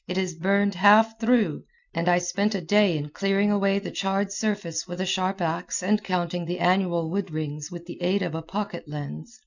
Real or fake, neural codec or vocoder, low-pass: real; none; 7.2 kHz